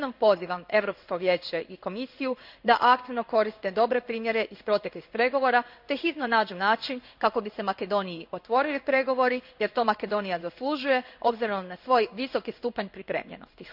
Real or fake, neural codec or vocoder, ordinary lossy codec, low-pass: fake; codec, 16 kHz in and 24 kHz out, 1 kbps, XY-Tokenizer; none; 5.4 kHz